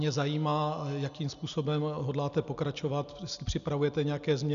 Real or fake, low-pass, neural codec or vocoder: real; 7.2 kHz; none